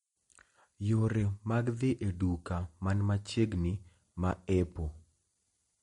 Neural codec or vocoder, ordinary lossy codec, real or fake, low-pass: none; MP3, 48 kbps; real; 19.8 kHz